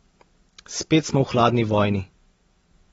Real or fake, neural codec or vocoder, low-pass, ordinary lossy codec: real; none; 10.8 kHz; AAC, 24 kbps